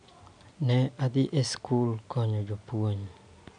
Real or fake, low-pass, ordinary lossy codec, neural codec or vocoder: real; 9.9 kHz; none; none